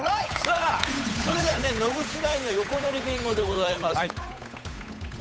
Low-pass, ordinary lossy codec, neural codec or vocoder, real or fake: none; none; codec, 16 kHz, 8 kbps, FunCodec, trained on Chinese and English, 25 frames a second; fake